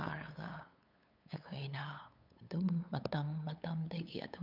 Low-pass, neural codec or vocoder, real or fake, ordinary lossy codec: 5.4 kHz; codec, 16 kHz, 8 kbps, FunCodec, trained on LibriTTS, 25 frames a second; fake; none